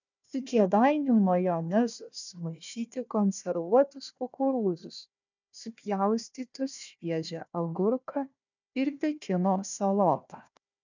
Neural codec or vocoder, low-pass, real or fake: codec, 16 kHz, 1 kbps, FunCodec, trained on Chinese and English, 50 frames a second; 7.2 kHz; fake